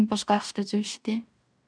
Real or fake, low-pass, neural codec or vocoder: fake; 9.9 kHz; codec, 16 kHz in and 24 kHz out, 0.9 kbps, LongCat-Audio-Codec, fine tuned four codebook decoder